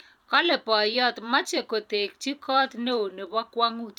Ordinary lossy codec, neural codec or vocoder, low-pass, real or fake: none; vocoder, 44.1 kHz, 128 mel bands every 512 samples, BigVGAN v2; 19.8 kHz; fake